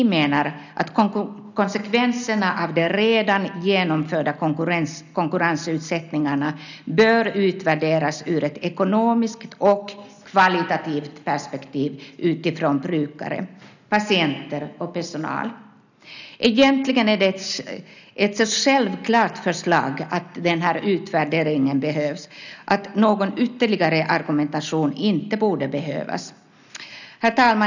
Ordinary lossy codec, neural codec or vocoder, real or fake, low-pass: none; none; real; 7.2 kHz